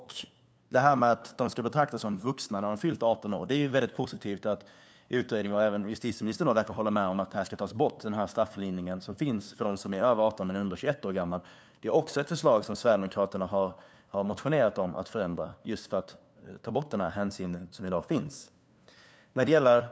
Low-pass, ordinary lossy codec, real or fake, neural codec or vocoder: none; none; fake; codec, 16 kHz, 2 kbps, FunCodec, trained on LibriTTS, 25 frames a second